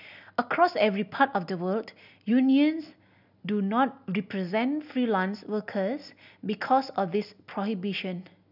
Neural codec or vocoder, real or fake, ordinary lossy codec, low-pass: none; real; none; 5.4 kHz